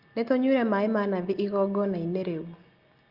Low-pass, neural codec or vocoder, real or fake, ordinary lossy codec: 5.4 kHz; none; real; Opus, 32 kbps